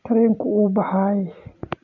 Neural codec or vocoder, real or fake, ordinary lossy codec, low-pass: none; real; none; 7.2 kHz